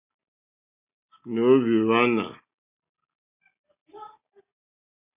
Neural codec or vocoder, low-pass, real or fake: none; 3.6 kHz; real